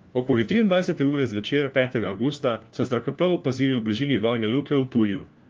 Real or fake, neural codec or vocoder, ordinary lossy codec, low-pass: fake; codec, 16 kHz, 1 kbps, FunCodec, trained on LibriTTS, 50 frames a second; Opus, 32 kbps; 7.2 kHz